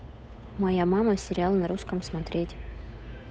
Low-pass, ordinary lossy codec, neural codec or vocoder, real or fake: none; none; codec, 16 kHz, 8 kbps, FunCodec, trained on Chinese and English, 25 frames a second; fake